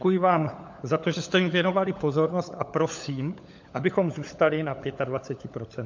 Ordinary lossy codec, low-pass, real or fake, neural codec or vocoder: MP3, 48 kbps; 7.2 kHz; fake; codec, 16 kHz, 16 kbps, FunCodec, trained on LibriTTS, 50 frames a second